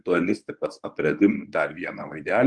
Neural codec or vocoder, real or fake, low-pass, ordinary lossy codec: codec, 24 kHz, 0.9 kbps, WavTokenizer, medium speech release version 1; fake; 10.8 kHz; Opus, 32 kbps